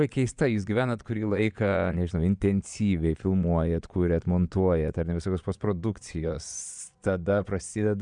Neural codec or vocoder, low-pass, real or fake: vocoder, 22.05 kHz, 80 mel bands, Vocos; 9.9 kHz; fake